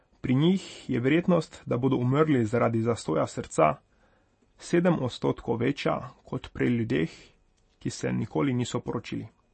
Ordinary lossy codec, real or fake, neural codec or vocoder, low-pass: MP3, 32 kbps; real; none; 10.8 kHz